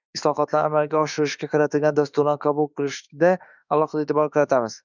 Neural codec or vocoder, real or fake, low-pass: autoencoder, 48 kHz, 32 numbers a frame, DAC-VAE, trained on Japanese speech; fake; 7.2 kHz